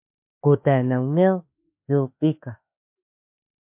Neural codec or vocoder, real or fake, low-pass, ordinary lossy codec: autoencoder, 48 kHz, 32 numbers a frame, DAC-VAE, trained on Japanese speech; fake; 3.6 kHz; MP3, 32 kbps